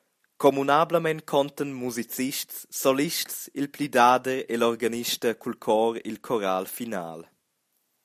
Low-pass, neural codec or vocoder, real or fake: 14.4 kHz; none; real